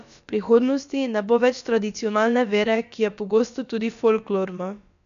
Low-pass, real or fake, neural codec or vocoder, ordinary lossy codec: 7.2 kHz; fake; codec, 16 kHz, about 1 kbps, DyCAST, with the encoder's durations; MP3, 96 kbps